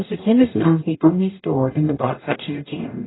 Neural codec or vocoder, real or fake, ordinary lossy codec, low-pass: codec, 44.1 kHz, 0.9 kbps, DAC; fake; AAC, 16 kbps; 7.2 kHz